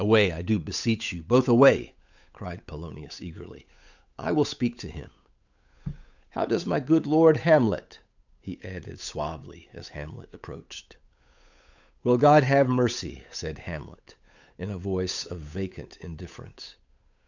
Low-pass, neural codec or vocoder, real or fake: 7.2 kHz; codec, 16 kHz, 8 kbps, FunCodec, trained on Chinese and English, 25 frames a second; fake